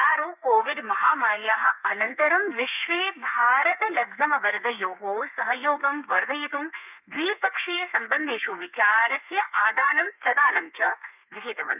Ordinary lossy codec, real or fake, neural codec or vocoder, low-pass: none; fake; codec, 32 kHz, 1.9 kbps, SNAC; 3.6 kHz